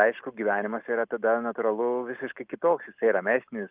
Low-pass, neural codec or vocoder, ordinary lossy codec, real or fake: 3.6 kHz; none; Opus, 24 kbps; real